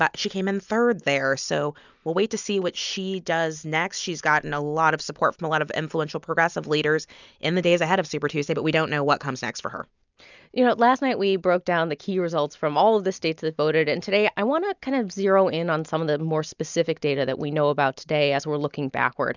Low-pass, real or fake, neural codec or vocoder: 7.2 kHz; real; none